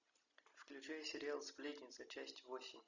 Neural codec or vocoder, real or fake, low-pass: none; real; 7.2 kHz